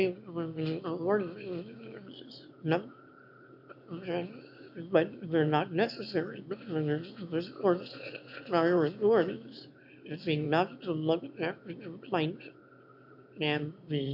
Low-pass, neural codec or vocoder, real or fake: 5.4 kHz; autoencoder, 22.05 kHz, a latent of 192 numbers a frame, VITS, trained on one speaker; fake